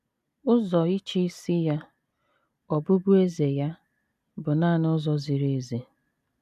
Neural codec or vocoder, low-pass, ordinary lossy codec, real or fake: none; 14.4 kHz; none; real